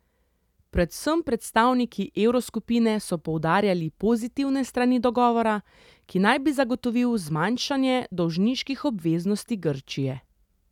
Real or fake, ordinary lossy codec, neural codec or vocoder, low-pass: real; none; none; 19.8 kHz